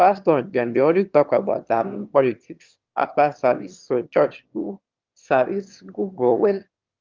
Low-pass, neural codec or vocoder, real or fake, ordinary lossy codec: 7.2 kHz; autoencoder, 22.05 kHz, a latent of 192 numbers a frame, VITS, trained on one speaker; fake; Opus, 32 kbps